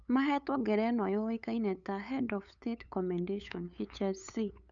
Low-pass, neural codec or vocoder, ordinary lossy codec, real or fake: 7.2 kHz; codec, 16 kHz, 8 kbps, FunCodec, trained on LibriTTS, 25 frames a second; none; fake